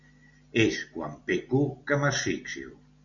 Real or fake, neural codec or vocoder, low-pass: real; none; 7.2 kHz